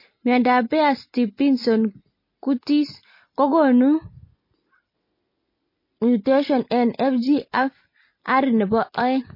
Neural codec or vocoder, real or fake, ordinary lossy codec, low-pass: none; real; MP3, 24 kbps; 5.4 kHz